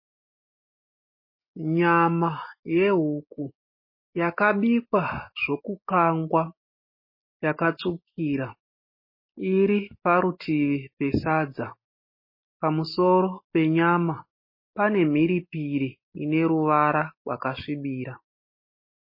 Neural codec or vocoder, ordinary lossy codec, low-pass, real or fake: none; MP3, 24 kbps; 5.4 kHz; real